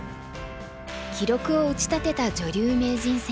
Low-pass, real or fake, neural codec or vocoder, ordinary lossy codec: none; real; none; none